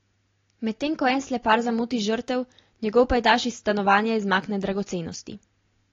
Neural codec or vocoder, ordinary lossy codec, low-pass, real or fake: none; AAC, 32 kbps; 7.2 kHz; real